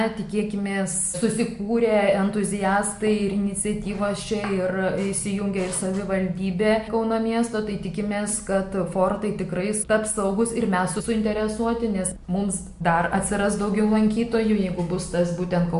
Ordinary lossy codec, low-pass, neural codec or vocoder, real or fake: MP3, 64 kbps; 10.8 kHz; none; real